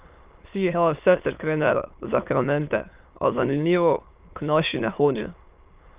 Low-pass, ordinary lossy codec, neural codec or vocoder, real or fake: 3.6 kHz; Opus, 32 kbps; autoencoder, 22.05 kHz, a latent of 192 numbers a frame, VITS, trained on many speakers; fake